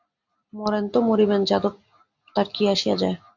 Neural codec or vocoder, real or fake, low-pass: none; real; 7.2 kHz